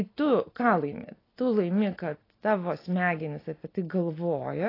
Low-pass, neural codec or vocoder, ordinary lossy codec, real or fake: 5.4 kHz; none; AAC, 24 kbps; real